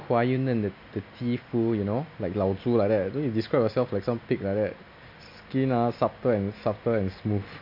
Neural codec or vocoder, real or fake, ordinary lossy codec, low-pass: none; real; MP3, 48 kbps; 5.4 kHz